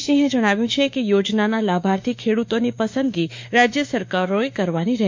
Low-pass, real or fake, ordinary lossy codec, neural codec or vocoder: 7.2 kHz; fake; MP3, 48 kbps; autoencoder, 48 kHz, 32 numbers a frame, DAC-VAE, trained on Japanese speech